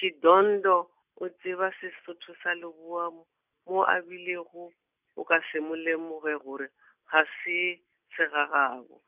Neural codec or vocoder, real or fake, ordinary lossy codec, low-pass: none; real; none; 3.6 kHz